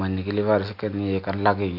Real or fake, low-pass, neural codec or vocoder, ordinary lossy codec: real; 5.4 kHz; none; AAC, 48 kbps